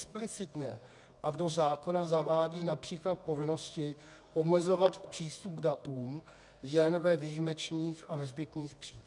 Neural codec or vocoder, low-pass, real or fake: codec, 24 kHz, 0.9 kbps, WavTokenizer, medium music audio release; 10.8 kHz; fake